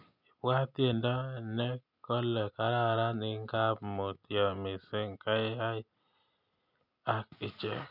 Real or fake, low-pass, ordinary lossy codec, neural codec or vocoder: real; 5.4 kHz; none; none